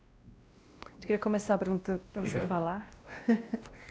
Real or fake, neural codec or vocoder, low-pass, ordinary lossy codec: fake; codec, 16 kHz, 1 kbps, X-Codec, WavLM features, trained on Multilingual LibriSpeech; none; none